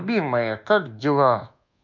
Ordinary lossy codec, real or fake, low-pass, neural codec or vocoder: MP3, 64 kbps; fake; 7.2 kHz; codec, 24 kHz, 1.2 kbps, DualCodec